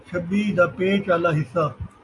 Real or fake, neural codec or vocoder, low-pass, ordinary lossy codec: real; none; 10.8 kHz; AAC, 48 kbps